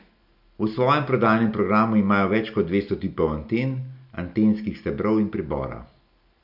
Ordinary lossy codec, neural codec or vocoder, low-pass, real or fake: none; none; 5.4 kHz; real